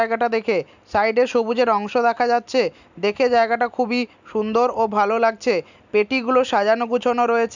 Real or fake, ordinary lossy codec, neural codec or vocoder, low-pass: real; none; none; 7.2 kHz